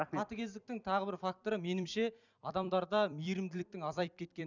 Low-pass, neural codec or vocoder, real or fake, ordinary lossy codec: 7.2 kHz; vocoder, 44.1 kHz, 128 mel bands every 256 samples, BigVGAN v2; fake; none